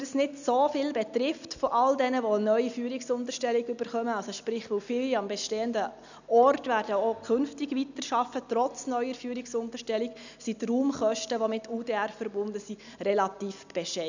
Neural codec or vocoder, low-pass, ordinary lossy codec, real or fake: none; 7.2 kHz; none; real